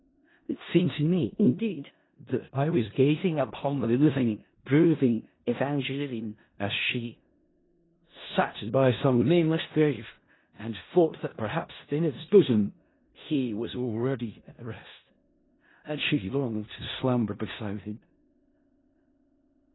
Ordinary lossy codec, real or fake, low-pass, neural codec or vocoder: AAC, 16 kbps; fake; 7.2 kHz; codec, 16 kHz in and 24 kHz out, 0.4 kbps, LongCat-Audio-Codec, four codebook decoder